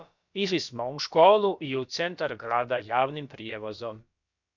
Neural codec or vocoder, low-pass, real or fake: codec, 16 kHz, about 1 kbps, DyCAST, with the encoder's durations; 7.2 kHz; fake